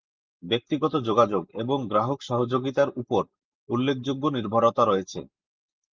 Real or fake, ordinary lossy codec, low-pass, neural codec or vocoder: real; Opus, 32 kbps; 7.2 kHz; none